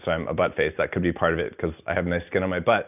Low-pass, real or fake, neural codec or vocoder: 3.6 kHz; real; none